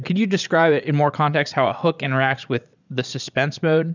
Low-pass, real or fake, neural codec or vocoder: 7.2 kHz; fake; vocoder, 22.05 kHz, 80 mel bands, Vocos